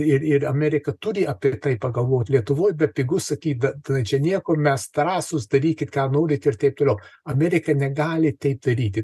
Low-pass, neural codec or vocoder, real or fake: 14.4 kHz; none; real